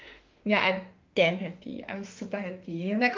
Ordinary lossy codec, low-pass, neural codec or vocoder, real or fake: Opus, 32 kbps; 7.2 kHz; autoencoder, 48 kHz, 32 numbers a frame, DAC-VAE, trained on Japanese speech; fake